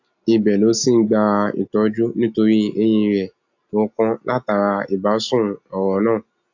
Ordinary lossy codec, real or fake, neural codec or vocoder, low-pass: AAC, 48 kbps; real; none; 7.2 kHz